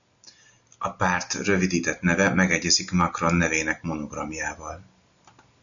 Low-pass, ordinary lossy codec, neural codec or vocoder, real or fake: 7.2 kHz; MP3, 96 kbps; none; real